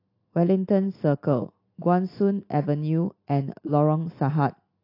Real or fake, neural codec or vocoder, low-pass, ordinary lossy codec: real; none; 5.4 kHz; AAC, 32 kbps